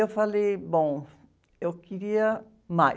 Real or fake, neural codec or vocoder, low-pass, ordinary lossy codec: real; none; none; none